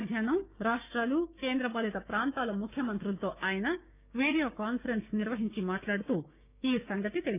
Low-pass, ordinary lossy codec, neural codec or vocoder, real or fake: 3.6 kHz; none; codec, 16 kHz, 6 kbps, DAC; fake